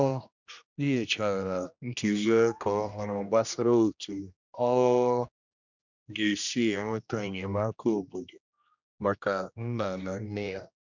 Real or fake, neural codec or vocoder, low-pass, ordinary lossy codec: fake; codec, 16 kHz, 1 kbps, X-Codec, HuBERT features, trained on general audio; 7.2 kHz; none